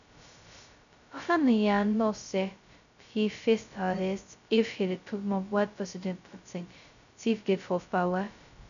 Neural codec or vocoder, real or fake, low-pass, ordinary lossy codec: codec, 16 kHz, 0.2 kbps, FocalCodec; fake; 7.2 kHz; none